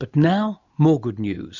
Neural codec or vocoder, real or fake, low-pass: none; real; 7.2 kHz